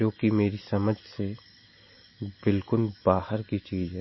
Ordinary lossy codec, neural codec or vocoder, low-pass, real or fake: MP3, 24 kbps; none; 7.2 kHz; real